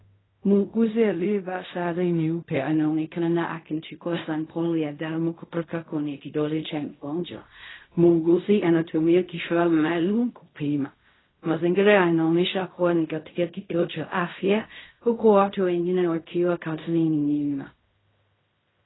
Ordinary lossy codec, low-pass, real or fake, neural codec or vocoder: AAC, 16 kbps; 7.2 kHz; fake; codec, 16 kHz in and 24 kHz out, 0.4 kbps, LongCat-Audio-Codec, fine tuned four codebook decoder